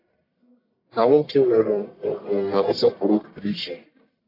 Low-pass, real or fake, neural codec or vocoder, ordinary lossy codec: 5.4 kHz; fake; codec, 44.1 kHz, 1.7 kbps, Pupu-Codec; AAC, 32 kbps